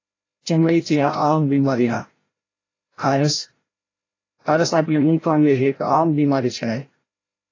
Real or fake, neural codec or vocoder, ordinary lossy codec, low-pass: fake; codec, 16 kHz, 0.5 kbps, FreqCodec, larger model; AAC, 32 kbps; 7.2 kHz